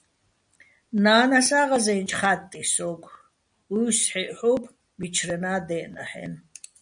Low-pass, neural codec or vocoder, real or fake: 9.9 kHz; none; real